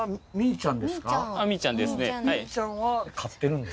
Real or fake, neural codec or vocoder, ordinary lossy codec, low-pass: real; none; none; none